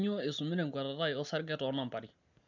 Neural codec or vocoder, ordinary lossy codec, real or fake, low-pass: none; none; real; 7.2 kHz